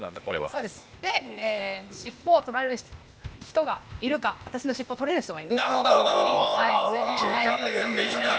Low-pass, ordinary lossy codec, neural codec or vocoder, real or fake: none; none; codec, 16 kHz, 0.8 kbps, ZipCodec; fake